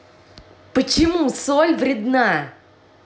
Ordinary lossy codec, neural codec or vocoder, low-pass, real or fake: none; none; none; real